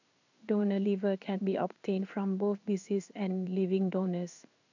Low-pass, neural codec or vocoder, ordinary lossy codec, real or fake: 7.2 kHz; codec, 16 kHz in and 24 kHz out, 1 kbps, XY-Tokenizer; none; fake